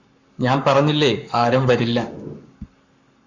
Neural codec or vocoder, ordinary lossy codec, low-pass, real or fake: codec, 44.1 kHz, 7.8 kbps, Pupu-Codec; Opus, 64 kbps; 7.2 kHz; fake